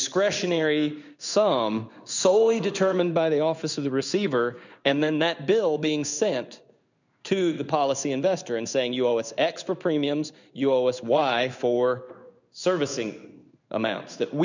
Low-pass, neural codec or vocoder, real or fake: 7.2 kHz; codec, 16 kHz in and 24 kHz out, 1 kbps, XY-Tokenizer; fake